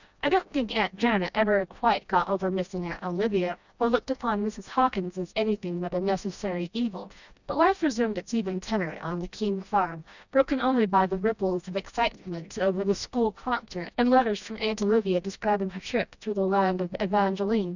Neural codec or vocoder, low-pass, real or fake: codec, 16 kHz, 1 kbps, FreqCodec, smaller model; 7.2 kHz; fake